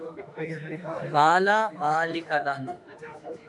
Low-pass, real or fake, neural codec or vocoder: 10.8 kHz; fake; autoencoder, 48 kHz, 32 numbers a frame, DAC-VAE, trained on Japanese speech